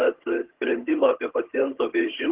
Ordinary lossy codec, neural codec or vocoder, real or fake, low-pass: Opus, 16 kbps; vocoder, 22.05 kHz, 80 mel bands, HiFi-GAN; fake; 3.6 kHz